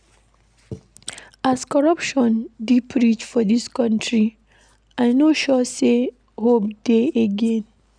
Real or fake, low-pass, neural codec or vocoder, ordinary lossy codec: real; 9.9 kHz; none; none